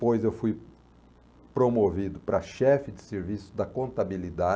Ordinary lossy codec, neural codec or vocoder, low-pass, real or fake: none; none; none; real